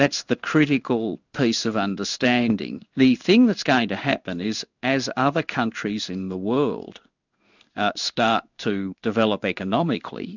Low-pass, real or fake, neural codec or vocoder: 7.2 kHz; fake; codec, 16 kHz in and 24 kHz out, 1 kbps, XY-Tokenizer